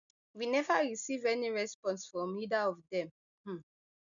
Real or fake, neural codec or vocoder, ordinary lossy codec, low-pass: real; none; none; 7.2 kHz